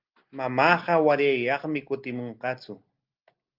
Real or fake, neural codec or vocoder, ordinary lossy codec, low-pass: real; none; Opus, 32 kbps; 5.4 kHz